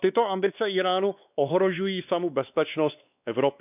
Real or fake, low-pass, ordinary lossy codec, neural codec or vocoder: fake; 3.6 kHz; none; codec, 16 kHz, 2 kbps, X-Codec, WavLM features, trained on Multilingual LibriSpeech